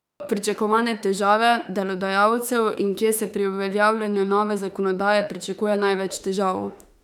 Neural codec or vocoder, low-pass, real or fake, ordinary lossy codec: autoencoder, 48 kHz, 32 numbers a frame, DAC-VAE, trained on Japanese speech; 19.8 kHz; fake; none